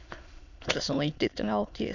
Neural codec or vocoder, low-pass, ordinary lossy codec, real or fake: autoencoder, 22.05 kHz, a latent of 192 numbers a frame, VITS, trained on many speakers; 7.2 kHz; none; fake